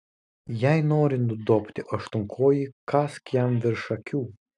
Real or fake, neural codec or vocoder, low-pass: real; none; 10.8 kHz